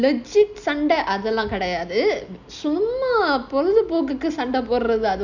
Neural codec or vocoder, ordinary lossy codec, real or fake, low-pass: none; none; real; 7.2 kHz